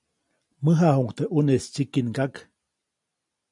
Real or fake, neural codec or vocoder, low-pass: real; none; 10.8 kHz